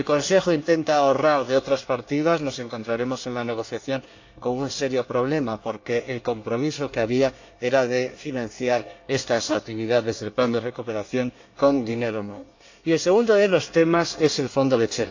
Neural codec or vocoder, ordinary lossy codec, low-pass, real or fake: codec, 24 kHz, 1 kbps, SNAC; AAC, 48 kbps; 7.2 kHz; fake